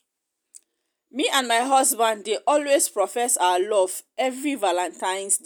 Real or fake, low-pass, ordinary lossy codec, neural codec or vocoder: real; none; none; none